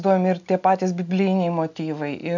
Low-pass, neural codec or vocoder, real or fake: 7.2 kHz; none; real